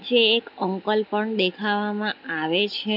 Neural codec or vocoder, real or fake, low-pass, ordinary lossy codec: none; real; 5.4 kHz; none